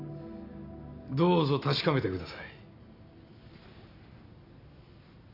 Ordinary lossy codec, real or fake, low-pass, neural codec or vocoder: AAC, 32 kbps; real; 5.4 kHz; none